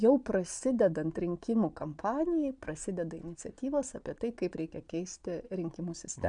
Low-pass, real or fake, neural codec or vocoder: 9.9 kHz; fake; vocoder, 22.05 kHz, 80 mel bands, Vocos